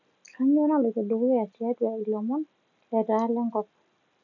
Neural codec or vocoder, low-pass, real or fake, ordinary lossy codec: none; 7.2 kHz; real; MP3, 64 kbps